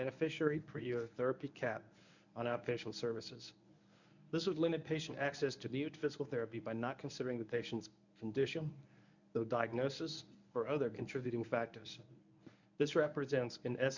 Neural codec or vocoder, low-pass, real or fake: codec, 24 kHz, 0.9 kbps, WavTokenizer, medium speech release version 1; 7.2 kHz; fake